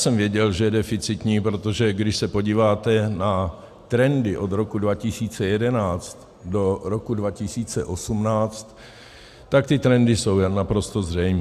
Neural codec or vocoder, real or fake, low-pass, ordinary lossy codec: none; real; 14.4 kHz; AAC, 96 kbps